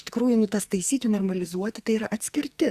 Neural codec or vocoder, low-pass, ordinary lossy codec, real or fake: codec, 44.1 kHz, 2.6 kbps, SNAC; 14.4 kHz; Opus, 64 kbps; fake